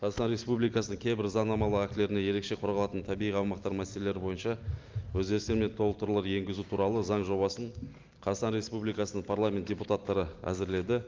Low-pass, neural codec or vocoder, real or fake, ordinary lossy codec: 7.2 kHz; none; real; Opus, 24 kbps